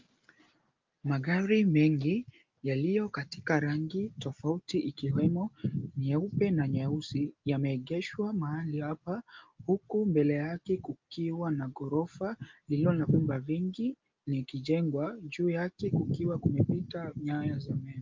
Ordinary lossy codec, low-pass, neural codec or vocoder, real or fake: Opus, 32 kbps; 7.2 kHz; none; real